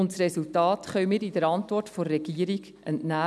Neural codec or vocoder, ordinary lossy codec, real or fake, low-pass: none; none; real; none